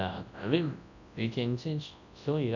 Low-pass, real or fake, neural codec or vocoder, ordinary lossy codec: 7.2 kHz; fake; codec, 24 kHz, 0.9 kbps, WavTokenizer, large speech release; none